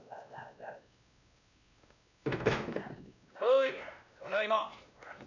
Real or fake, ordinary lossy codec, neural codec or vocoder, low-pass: fake; none; codec, 16 kHz, 1 kbps, X-Codec, WavLM features, trained on Multilingual LibriSpeech; 7.2 kHz